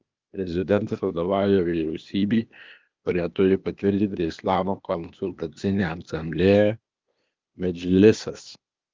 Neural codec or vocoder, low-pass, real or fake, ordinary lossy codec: codec, 16 kHz, 0.8 kbps, ZipCodec; 7.2 kHz; fake; Opus, 24 kbps